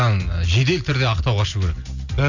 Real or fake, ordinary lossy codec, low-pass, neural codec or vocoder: real; none; 7.2 kHz; none